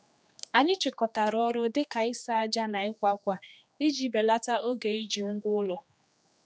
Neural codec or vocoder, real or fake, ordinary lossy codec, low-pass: codec, 16 kHz, 2 kbps, X-Codec, HuBERT features, trained on general audio; fake; none; none